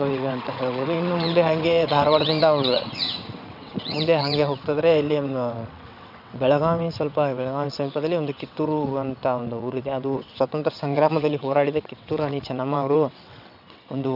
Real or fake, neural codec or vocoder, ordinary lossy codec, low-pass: fake; vocoder, 22.05 kHz, 80 mel bands, WaveNeXt; none; 5.4 kHz